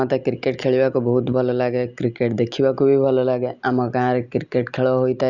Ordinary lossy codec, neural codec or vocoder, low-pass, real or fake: Opus, 64 kbps; none; 7.2 kHz; real